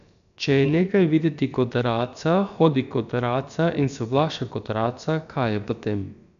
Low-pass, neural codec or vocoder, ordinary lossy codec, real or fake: 7.2 kHz; codec, 16 kHz, about 1 kbps, DyCAST, with the encoder's durations; none; fake